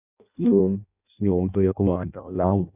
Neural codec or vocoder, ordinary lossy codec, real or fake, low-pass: codec, 16 kHz in and 24 kHz out, 0.6 kbps, FireRedTTS-2 codec; none; fake; 3.6 kHz